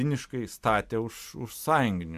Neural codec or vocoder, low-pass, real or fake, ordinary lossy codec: none; 14.4 kHz; real; AAC, 96 kbps